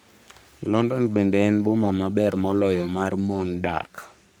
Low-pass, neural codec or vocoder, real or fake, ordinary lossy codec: none; codec, 44.1 kHz, 3.4 kbps, Pupu-Codec; fake; none